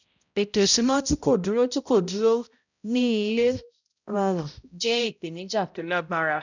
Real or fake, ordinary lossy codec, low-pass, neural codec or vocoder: fake; none; 7.2 kHz; codec, 16 kHz, 0.5 kbps, X-Codec, HuBERT features, trained on balanced general audio